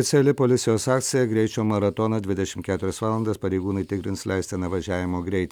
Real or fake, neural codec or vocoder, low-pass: real; none; 19.8 kHz